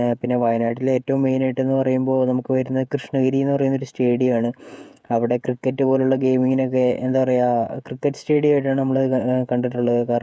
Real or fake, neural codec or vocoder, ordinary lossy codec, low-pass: fake; codec, 16 kHz, 16 kbps, FreqCodec, smaller model; none; none